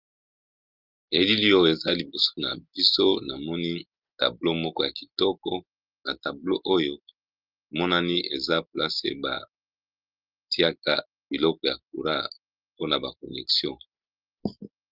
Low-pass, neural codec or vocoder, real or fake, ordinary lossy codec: 5.4 kHz; none; real; Opus, 24 kbps